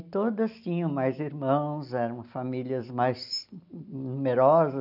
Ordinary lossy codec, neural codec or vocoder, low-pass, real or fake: none; none; 5.4 kHz; real